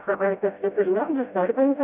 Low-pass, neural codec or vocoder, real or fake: 3.6 kHz; codec, 16 kHz, 0.5 kbps, FreqCodec, smaller model; fake